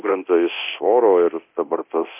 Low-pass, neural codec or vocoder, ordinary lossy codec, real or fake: 3.6 kHz; codec, 24 kHz, 0.9 kbps, DualCodec; MP3, 32 kbps; fake